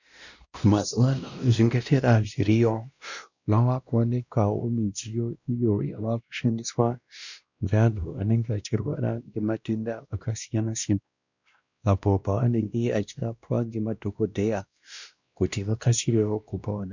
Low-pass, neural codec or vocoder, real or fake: 7.2 kHz; codec, 16 kHz, 1 kbps, X-Codec, WavLM features, trained on Multilingual LibriSpeech; fake